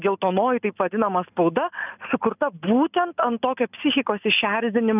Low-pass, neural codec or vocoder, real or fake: 3.6 kHz; none; real